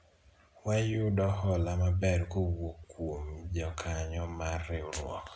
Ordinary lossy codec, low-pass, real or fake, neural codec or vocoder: none; none; real; none